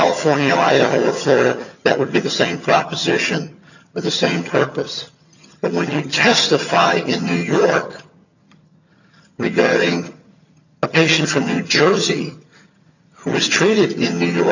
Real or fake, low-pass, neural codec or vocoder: fake; 7.2 kHz; vocoder, 22.05 kHz, 80 mel bands, HiFi-GAN